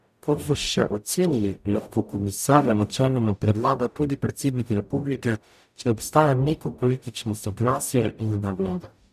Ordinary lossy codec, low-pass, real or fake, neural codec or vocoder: none; 14.4 kHz; fake; codec, 44.1 kHz, 0.9 kbps, DAC